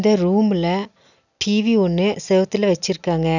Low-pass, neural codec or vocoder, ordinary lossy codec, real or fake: 7.2 kHz; none; none; real